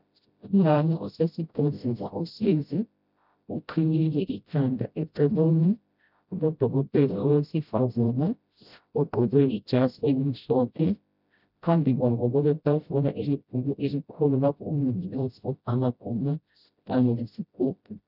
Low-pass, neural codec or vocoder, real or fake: 5.4 kHz; codec, 16 kHz, 0.5 kbps, FreqCodec, smaller model; fake